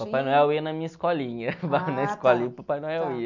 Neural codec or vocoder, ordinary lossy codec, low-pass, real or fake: none; none; 7.2 kHz; real